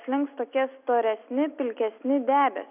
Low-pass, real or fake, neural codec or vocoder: 3.6 kHz; real; none